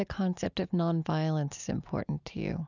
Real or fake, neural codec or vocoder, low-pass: real; none; 7.2 kHz